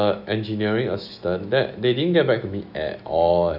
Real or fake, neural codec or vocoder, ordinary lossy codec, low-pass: fake; autoencoder, 48 kHz, 128 numbers a frame, DAC-VAE, trained on Japanese speech; none; 5.4 kHz